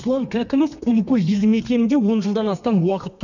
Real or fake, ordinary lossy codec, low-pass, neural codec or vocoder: fake; none; 7.2 kHz; codec, 32 kHz, 1.9 kbps, SNAC